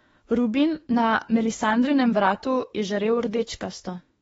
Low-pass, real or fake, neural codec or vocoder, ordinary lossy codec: 19.8 kHz; fake; autoencoder, 48 kHz, 32 numbers a frame, DAC-VAE, trained on Japanese speech; AAC, 24 kbps